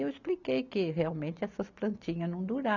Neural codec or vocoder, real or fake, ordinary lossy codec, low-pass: none; real; none; 7.2 kHz